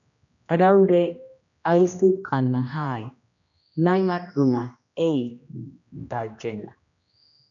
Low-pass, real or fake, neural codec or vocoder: 7.2 kHz; fake; codec, 16 kHz, 1 kbps, X-Codec, HuBERT features, trained on general audio